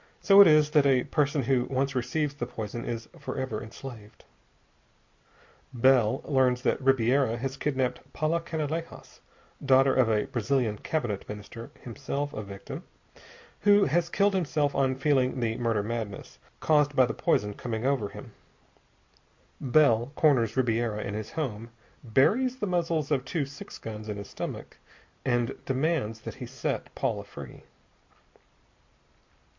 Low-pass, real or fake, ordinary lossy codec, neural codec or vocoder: 7.2 kHz; real; MP3, 48 kbps; none